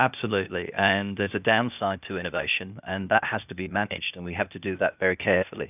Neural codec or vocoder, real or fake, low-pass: codec, 16 kHz, 0.8 kbps, ZipCodec; fake; 3.6 kHz